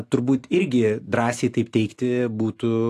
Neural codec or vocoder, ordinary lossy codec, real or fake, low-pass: none; AAC, 64 kbps; real; 14.4 kHz